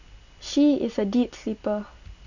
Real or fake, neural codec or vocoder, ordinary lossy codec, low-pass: real; none; none; 7.2 kHz